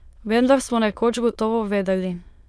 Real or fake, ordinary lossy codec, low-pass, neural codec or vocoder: fake; none; none; autoencoder, 22.05 kHz, a latent of 192 numbers a frame, VITS, trained on many speakers